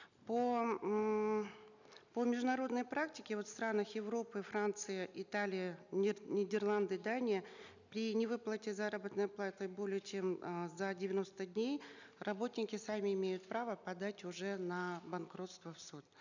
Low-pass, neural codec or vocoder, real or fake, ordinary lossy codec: 7.2 kHz; none; real; none